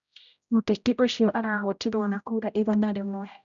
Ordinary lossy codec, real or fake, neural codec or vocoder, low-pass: none; fake; codec, 16 kHz, 0.5 kbps, X-Codec, HuBERT features, trained on general audio; 7.2 kHz